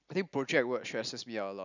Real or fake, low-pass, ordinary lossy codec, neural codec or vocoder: real; 7.2 kHz; none; none